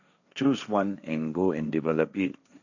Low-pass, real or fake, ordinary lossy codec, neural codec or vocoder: 7.2 kHz; fake; none; codec, 16 kHz, 1.1 kbps, Voila-Tokenizer